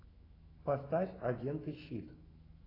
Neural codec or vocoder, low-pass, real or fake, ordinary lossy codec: autoencoder, 48 kHz, 128 numbers a frame, DAC-VAE, trained on Japanese speech; 5.4 kHz; fake; AAC, 24 kbps